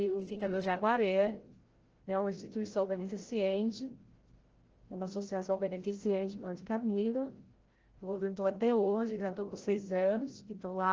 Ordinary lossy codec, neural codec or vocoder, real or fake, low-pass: Opus, 16 kbps; codec, 16 kHz, 0.5 kbps, FreqCodec, larger model; fake; 7.2 kHz